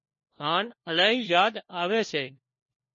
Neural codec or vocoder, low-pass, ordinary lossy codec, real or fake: codec, 16 kHz, 1 kbps, FunCodec, trained on LibriTTS, 50 frames a second; 7.2 kHz; MP3, 32 kbps; fake